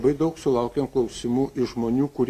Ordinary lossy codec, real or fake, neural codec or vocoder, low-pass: AAC, 96 kbps; real; none; 14.4 kHz